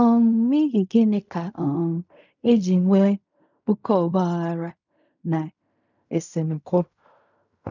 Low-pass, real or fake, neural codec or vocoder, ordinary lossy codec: 7.2 kHz; fake; codec, 16 kHz in and 24 kHz out, 0.4 kbps, LongCat-Audio-Codec, fine tuned four codebook decoder; none